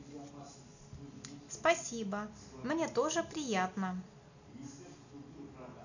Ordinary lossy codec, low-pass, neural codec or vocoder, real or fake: none; 7.2 kHz; none; real